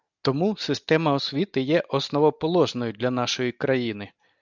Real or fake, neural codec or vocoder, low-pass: real; none; 7.2 kHz